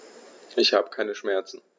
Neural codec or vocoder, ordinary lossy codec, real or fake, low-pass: none; none; real; none